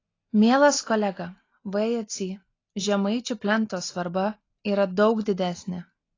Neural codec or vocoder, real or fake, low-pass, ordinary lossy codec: none; real; 7.2 kHz; AAC, 32 kbps